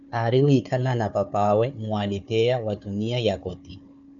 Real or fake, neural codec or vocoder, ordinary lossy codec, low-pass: fake; codec, 16 kHz, 4 kbps, FunCodec, trained on Chinese and English, 50 frames a second; MP3, 96 kbps; 7.2 kHz